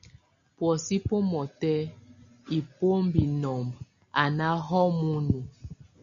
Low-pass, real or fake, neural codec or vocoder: 7.2 kHz; real; none